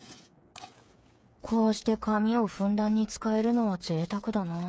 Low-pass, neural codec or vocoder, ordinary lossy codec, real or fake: none; codec, 16 kHz, 8 kbps, FreqCodec, smaller model; none; fake